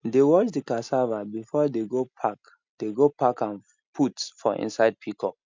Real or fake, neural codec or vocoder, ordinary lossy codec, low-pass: real; none; MP3, 64 kbps; 7.2 kHz